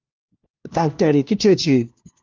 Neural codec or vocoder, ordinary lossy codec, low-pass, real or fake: codec, 16 kHz, 1 kbps, FunCodec, trained on LibriTTS, 50 frames a second; Opus, 32 kbps; 7.2 kHz; fake